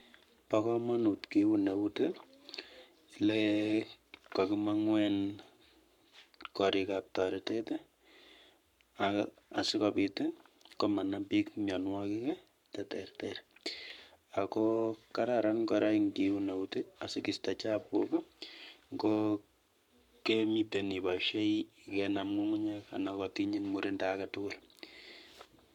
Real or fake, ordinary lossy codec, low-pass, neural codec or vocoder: fake; none; 19.8 kHz; codec, 44.1 kHz, 7.8 kbps, Pupu-Codec